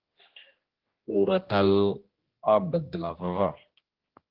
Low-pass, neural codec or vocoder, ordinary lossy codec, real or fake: 5.4 kHz; codec, 16 kHz, 1 kbps, X-Codec, HuBERT features, trained on general audio; Opus, 16 kbps; fake